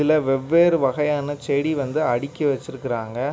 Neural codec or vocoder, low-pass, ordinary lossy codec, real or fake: none; none; none; real